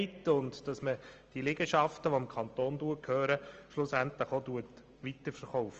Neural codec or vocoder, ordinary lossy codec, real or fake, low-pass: none; Opus, 24 kbps; real; 7.2 kHz